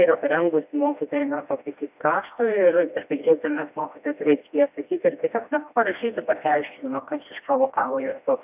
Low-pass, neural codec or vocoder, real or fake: 3.6 kHz; codec, 16 kHz, 1 kbps, FreqCodec, smaller model; fake